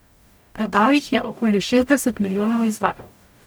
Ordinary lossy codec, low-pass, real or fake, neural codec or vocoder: none; none; fake; codec, 44.1 kHz, 0.9 kbps, DAC